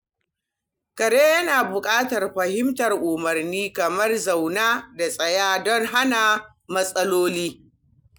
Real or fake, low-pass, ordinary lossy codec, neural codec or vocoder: real; none; none; none